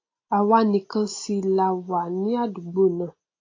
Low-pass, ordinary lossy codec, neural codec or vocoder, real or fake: 7.2 kHz; AAC, 32 kbps; none; real